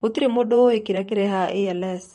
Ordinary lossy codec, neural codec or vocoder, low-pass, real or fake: MP3, 48 kbps; vocoder, 44.1 kHz, 128 mel bands, Pupu-Vocoder; 19.8 kHz; fake